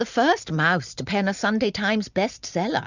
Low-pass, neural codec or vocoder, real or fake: 7.2 kHz; none; real